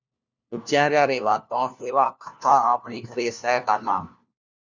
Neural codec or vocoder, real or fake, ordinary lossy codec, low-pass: codec, 16 kHz, 1 kbps, FunCodec, trained on LibriTTS, 50 frames a second; fake; Opus, 64 kbps; 7.2 kHz